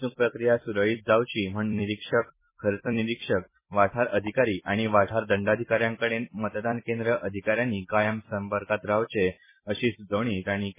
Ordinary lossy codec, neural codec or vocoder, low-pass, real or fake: MP3, 16 kbps; vocoder, 44.1 kHz, 128 mel bands every 256 samples, BigVGAN v2; 3.6 kHz; fake